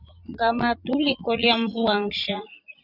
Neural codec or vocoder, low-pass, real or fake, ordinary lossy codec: vocoder, 44.1 kHz, 80 mel bands, Vocos; 5.4 kHz; fake; Opus, 64 kbps